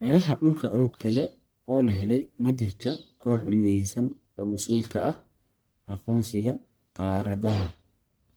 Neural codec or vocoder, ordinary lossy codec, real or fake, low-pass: codec, 44.1 kHz, 1.7 kbps, Pupu-Codec; none; fake; none